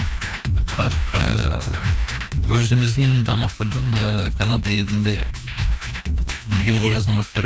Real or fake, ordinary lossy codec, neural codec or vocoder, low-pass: fake; none; codec, 16 kHz, 1 kbps, FreqCodec, larger model; none